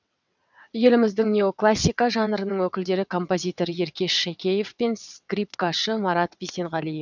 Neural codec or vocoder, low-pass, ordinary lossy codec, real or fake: vocoder, 22.05 kHz, 80 mel bands, WaveNeXt; 7.2 kHz; none; fake